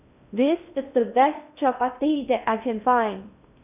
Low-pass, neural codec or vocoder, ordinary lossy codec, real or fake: 3.6 kHz; codec, 16 kHz in and 24 kHz out, 0.6 kbps, FocalCodec, streaming, 2048 codes; none; fake